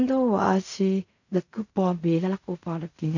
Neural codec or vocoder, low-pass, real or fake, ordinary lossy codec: codec, 16 kHz in and 24 kHz out, 0.4 kbps, LongCat-Audio-Codec, fine tuned four codebook decoder; 7.2 kHz; fake; none